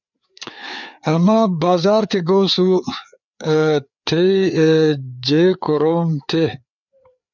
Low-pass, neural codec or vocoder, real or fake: 7.2 kHz; codec, 16 kHz, 4 kbps, FreqCodec, larger model; fake